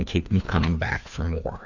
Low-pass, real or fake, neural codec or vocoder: 7.2 kHz; fake; codec, 16 kHz, 2 kbps, FreqCodec, larger model